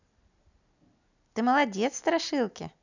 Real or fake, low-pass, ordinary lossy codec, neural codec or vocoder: real; 7.2 kHz; none; none